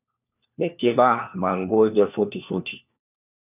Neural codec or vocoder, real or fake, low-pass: codec, 16 kHz, 1 kbps, FunCodec, trained on LibriTTS, 50 frames a second; fake; 3.6 kHz